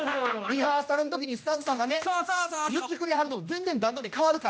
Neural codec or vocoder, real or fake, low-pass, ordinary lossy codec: codec, 16 kHz, 1 kbps, X-Codec, HuBERT features, trained on general audio; fake; none; none